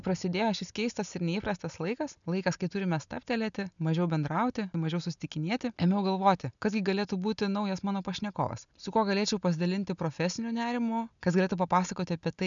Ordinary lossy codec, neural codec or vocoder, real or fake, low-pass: MP3, 96 kbps; none; real; 7.2 kHz